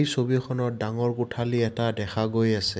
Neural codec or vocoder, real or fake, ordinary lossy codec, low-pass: none; real; none; none